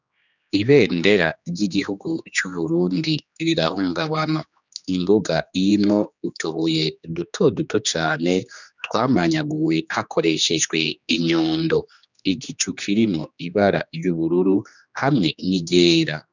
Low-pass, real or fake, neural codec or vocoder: 7.2 kHz; fake; codec, 16 kHz, 2 kbps, X-Codec, HuBERT features, trained on general audio